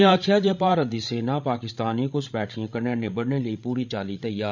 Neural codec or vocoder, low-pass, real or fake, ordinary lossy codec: codec, 16 kHz, 16 kbps, FreqCodec, larger model; 7.2 kHz; fake; none